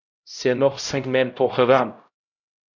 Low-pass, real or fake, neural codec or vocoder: 7.2 kHz; fake; codec, 16 kHz, 0.5 kbps, X-Codec, HuBERT features, trained on LibriSpeech